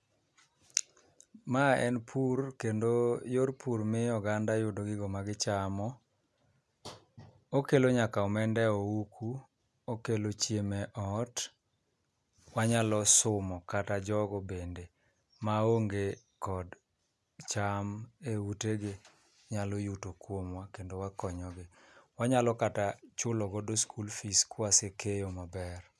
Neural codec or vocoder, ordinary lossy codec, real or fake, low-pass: none; none; real; none